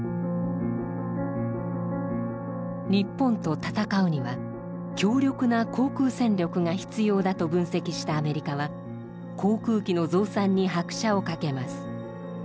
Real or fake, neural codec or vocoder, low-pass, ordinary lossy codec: real; none; none; none